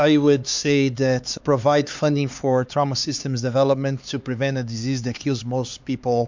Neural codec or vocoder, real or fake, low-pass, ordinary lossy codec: codec, 16 kHz, 4 kbps, X-Codec, HuBERT features, trained on LibriSpeech; fake; 7.2 kHz; MP3, 64 kbps